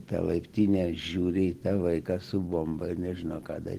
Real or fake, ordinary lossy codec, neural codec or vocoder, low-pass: real; Opus, 16 kbps; none; 14.4 kHz